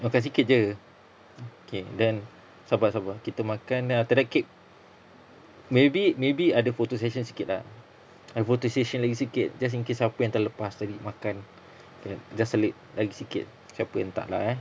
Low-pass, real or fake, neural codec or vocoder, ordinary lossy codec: none; real; none; none